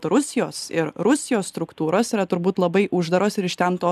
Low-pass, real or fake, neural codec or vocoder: 14.4 kHz; real; none